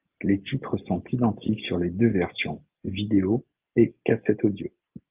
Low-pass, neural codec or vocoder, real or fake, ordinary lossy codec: 3.6 kHz; none; real; Opus, 32 kbps